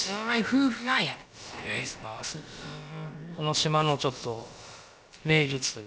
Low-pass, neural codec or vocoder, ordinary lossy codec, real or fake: none; codec, 16 kHz, about 1 kbps, DyCAST, with the encoder's durations; none; fake